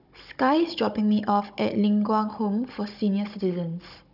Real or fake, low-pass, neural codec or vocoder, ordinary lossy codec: fake; 5.4 kHz; codec, 16 kHz, 16 kbps, FunCodec, trained on Chinese and English, 50 frames a second; none